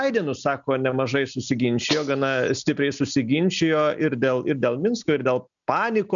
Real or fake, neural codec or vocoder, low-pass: real; none; 7.2 kHz